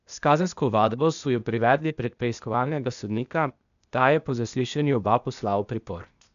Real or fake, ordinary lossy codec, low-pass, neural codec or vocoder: fake; none; 7.2 kHz; codec, 16 kHz, 0.8 kbps, ZipCodec